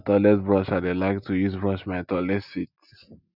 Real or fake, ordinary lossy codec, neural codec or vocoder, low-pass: real; none; none; 5.4 kHz